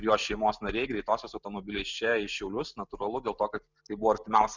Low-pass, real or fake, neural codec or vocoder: 7.2 kHz; real; none